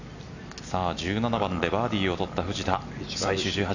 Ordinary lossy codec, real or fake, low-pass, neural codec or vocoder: none; real; 7.2 kHz; none